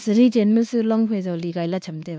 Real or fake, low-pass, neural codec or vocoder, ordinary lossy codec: fake; none; codec, 16 kHz, 2 kbps, X-Codec, WavLM features, trained on Multilingual LibriSpeech; none